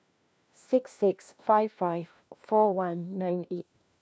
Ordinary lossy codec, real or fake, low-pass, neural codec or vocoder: none; fake; none; codec, 16 kHz, 1 kbps, FunCodec, trained on LibriTTS, 50 frames a second